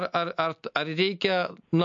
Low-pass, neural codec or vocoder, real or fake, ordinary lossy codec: 7.2 kHz; none; real; MP3, 48 kbps